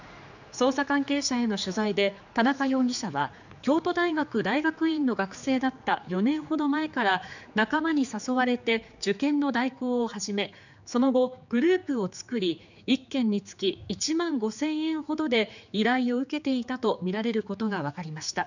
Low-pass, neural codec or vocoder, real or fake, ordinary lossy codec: 7.2 kHz; codec, 16 kHz, 4 kbps, X-Codec, HuBERT features, trained on general audio; fake; none